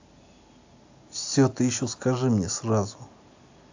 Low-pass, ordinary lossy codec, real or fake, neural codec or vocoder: 7.2 kHz; AAC, 48 kbps; real; none